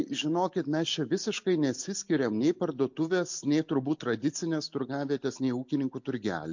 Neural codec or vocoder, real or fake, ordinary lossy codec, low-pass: none; real; MP3, 48 kbps; 7.2 kHz